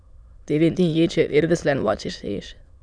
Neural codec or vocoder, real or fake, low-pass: autoencoder, 22.05 kHz, a latent of 192 numbers a frame, VITS, trained on many speakers; fake; 9.9 kHz